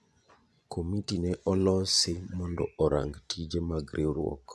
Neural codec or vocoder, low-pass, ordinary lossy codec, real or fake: vocoder, 24 kHz, 100 mel bands, Vocos; none; none; fake